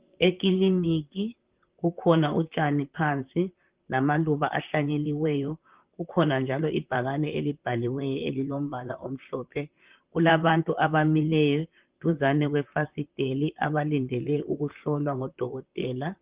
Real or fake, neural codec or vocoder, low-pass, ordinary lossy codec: fake; vocoder, 44.1 kHz, 128 mel bands, Pupu-Vocoder; 3.6 kHz; Opus, 32 kbps